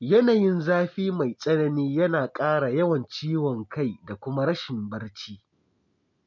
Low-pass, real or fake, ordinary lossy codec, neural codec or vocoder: 7.2 kHz; real; none; none